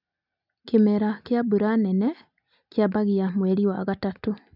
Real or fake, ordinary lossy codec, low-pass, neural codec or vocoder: real; none; 5.4 kHz; none